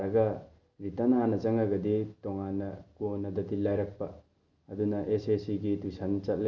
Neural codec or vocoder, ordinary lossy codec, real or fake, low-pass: none; none; real; 7.2 kHz